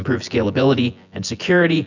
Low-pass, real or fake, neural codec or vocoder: 7.2 kHz; fake; vocoder, 24 kHz, 100 mel bands, Vocos